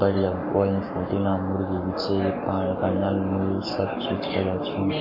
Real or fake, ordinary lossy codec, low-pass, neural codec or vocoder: fake; MP3, 32 kbps; 5.4 kHz; codec, 44.1 kHz, 7.8 kbps, Pupu-Codec